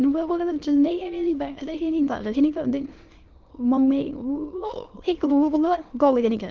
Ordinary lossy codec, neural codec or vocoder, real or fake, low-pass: Opus, 16 kbps; autoencoder, 22.05 kHz, a latent of 192 numbers a frame, VITS, trained on many speakers; fake; 7.2 kHz